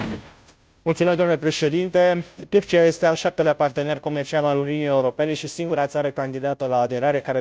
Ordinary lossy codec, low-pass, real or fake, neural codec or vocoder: none; none; fake; codec, 16 kHz, 0.5 kbps, FunCodec, trained on Chinese and English, 25 frames a second